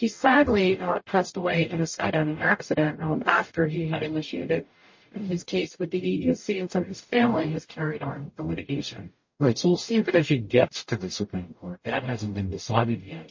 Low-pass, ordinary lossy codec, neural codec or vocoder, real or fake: 7.2 kHz; MP3, 32 kbps; codec, 44.1 kHz, 0.9 kbps, DAC; fake